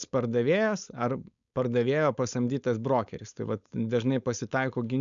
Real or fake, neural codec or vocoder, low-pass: fake; codec, 16 kHz, 4.8 kbps, FACodec; 7.2 kHz